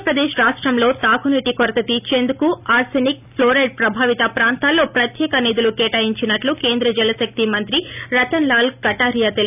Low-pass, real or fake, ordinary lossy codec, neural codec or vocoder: 3.6 kHz; real; none; none